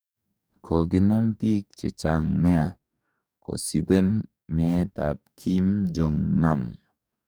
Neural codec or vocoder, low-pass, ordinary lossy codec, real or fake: codec, 44.1 kHz, 2.6 kbps, DAC; none; none; fake